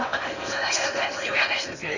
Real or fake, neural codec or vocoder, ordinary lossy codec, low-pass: fake; codec, 16 kHz in and 24 kHz out, 0.8 kbps, FocalCodec, streaming, 65536 codes; none; 7.2 kHz